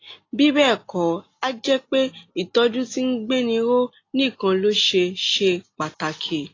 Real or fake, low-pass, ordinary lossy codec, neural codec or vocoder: real; 7.2 kHz; AAC, 32 kbps; none